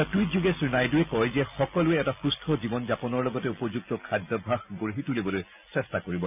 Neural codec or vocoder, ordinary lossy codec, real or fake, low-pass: none; none; real; 3.6 kHz